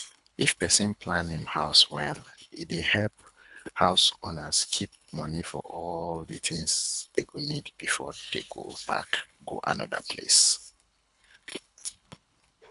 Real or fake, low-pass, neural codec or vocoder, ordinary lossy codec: fake; 10.8 kHz; codec, 24 kHz, 3 kbps, HILCodec; AAC, 96 kbps